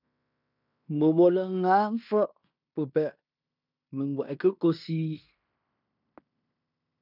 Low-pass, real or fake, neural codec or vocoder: 5.4 kHz; fake; codec, 16 kHz in and 24 kHz out, 0.9 kbps, LongCat-Audio-Codec, fine tuned four codebook decoder